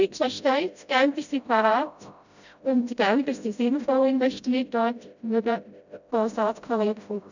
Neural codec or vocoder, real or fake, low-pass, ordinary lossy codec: codec, 16 kHz, 0.5 kbps, FreqCodec, smaller model; fake; 7.2 kHz; none